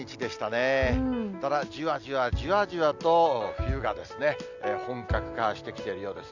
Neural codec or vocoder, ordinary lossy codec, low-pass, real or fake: none; none; 7.2 kHz; real